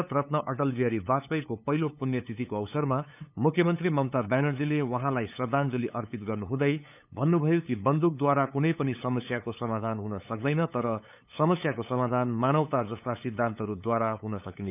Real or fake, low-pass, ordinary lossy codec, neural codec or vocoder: fake; 3.6 kHz; none; codec, 16 kHz, 8 kbps, FunCodec, trained on LibriTTS, 25 frames a second